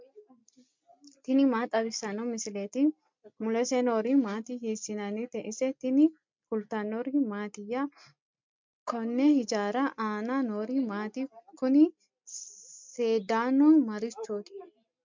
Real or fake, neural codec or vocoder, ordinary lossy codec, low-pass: real; none; MP3, 64 kbps; 7.2 kHz